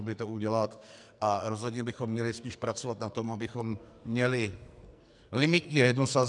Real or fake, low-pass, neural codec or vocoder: fake; 10.8 kHz; codec, 44.1 kHz, 2.6 kbps, SNAC